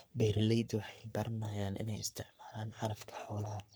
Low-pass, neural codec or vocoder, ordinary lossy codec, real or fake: none; codec, 44.1 kHz, 3.4 kbps, Pupu-Codec; none; fake